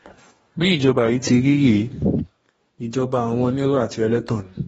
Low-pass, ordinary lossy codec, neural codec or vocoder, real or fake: 19.8 kHz; AAC, 24 kbps; codec, 44.1 kHz, 2.6 kbps, DAC; fake